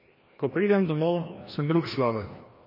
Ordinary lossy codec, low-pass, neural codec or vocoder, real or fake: MP3, 24 kbps; 5.4 kHz; codec, 16 kHz, 1 kbps, FreqCodec, larger model; fake